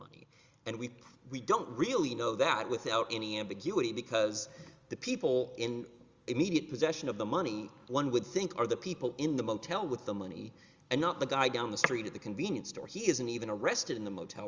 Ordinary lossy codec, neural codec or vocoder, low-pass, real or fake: Opus, 32 kbps; none; 7.2 kHz; real